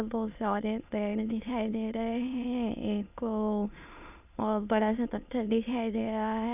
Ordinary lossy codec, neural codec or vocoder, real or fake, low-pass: none; autoencoder, 22.05 kHz, a latent of 192 numbers a frame, VITS, trained on many speakers; fake; 3.6 kHz